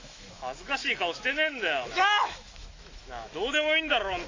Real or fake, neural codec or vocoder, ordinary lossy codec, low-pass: fake; autoencoder, 48 kHz, 128 numbers a frame, DAC-VAE, trained on Japanese speech; AAC, 32 kbps; 7.2 kHz